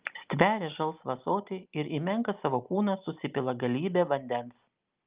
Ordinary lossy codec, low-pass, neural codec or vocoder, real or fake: Opus, 24 kbps; 3.6 kHz; none; real